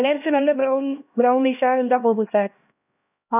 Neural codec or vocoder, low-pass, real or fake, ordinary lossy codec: codec, 16 kHz, 1 kbps, X-Codec, HuBERT features, trained on LibriSpeech; 3.6 kHz; fake; none